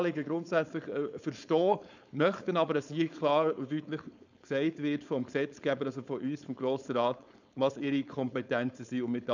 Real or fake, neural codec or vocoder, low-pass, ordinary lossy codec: fake; codec, 16 kHz, 4.8 kbps, FACodec; 7.2 kHz; none